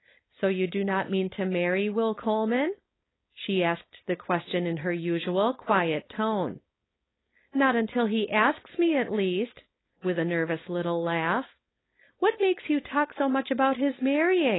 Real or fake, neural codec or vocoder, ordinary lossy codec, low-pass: fake; codec, 16 kHz, 4.8 kbps, FACodec; AAC, 16 kbps; 7.2 kHz